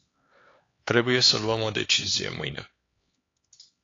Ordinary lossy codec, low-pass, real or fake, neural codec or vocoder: AAC, 32 kbps; 7.2 kHz; fake; codec, 16 kHz, 4 kbps, X-Codec, HuBERT features, trained on LibriSpeech